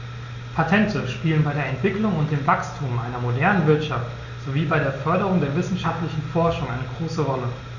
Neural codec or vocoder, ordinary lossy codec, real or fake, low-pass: none; none; real; 7.2 kHz